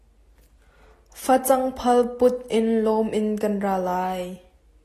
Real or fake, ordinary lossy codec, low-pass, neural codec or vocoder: real; AAC, 48 kbps; 14.4 kHz; none